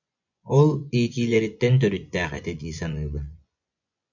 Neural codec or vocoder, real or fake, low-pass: vocoder, 24 kHz, 100 mel bands, Vocos; fake; 7.2 kHz